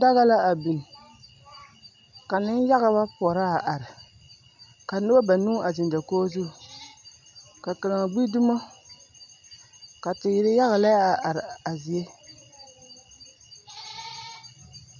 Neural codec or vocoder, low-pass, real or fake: none; 7.2 kHz; real